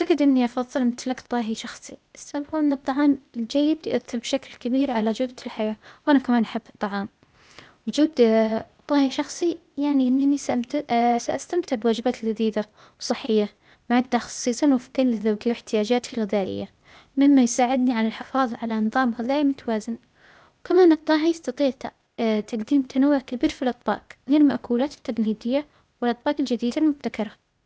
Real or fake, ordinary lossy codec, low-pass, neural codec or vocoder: fake; none; none; codec, 16 kHz, 0.8 kbps, ZipCodec